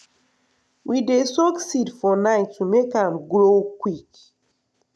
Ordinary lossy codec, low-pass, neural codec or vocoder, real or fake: none; none; none; real